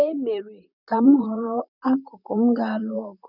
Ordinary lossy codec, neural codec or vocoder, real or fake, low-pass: none; vocoder, 44.1 kHz, 128 mel bands, Pupu-Vocoder; fake; 5.4 kHz